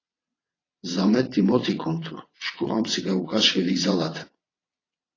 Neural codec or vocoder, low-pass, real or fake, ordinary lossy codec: vocoder, 22.05 kHz, 80 mel bands, WaveNeXt; 7.2 kHz; fake; AAC, 32 kbps